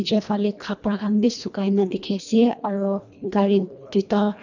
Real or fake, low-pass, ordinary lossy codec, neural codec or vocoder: fake; 7.2 kHz; none; codec, 24 kHz, 1.5 kbps, HILCodec